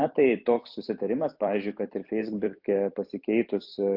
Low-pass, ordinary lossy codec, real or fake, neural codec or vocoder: 5.4 kHz; AAC, 48 kbps; fake; vocoder, 44.1 kHz, 128 mel bands every 256 samples, BigVGAN v2